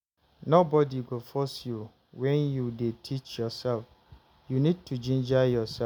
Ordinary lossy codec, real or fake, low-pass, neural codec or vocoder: none; real; none; none